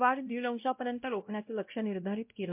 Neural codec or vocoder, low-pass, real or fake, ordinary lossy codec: codec, 16 kHz, 0.5 kbps, X-Codec, WavLM features, trained on Multilingual LibriSpeech; 3.6 kHz; fake; MP3, 32 kbps